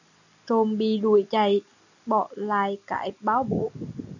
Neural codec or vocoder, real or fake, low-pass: none; real; 7.2 kHz